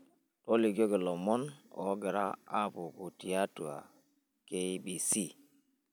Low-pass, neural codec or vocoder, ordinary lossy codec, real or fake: none; none; none; real